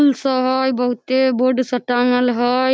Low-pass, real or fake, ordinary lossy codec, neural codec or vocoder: none; real; none; none